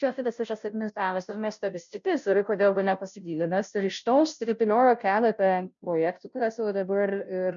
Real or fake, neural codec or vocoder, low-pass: fake; codec, 16 kHz, 0.5 kbps, FunCodec, trained on Chinese and English, 25 frames a second; 7.2 kHz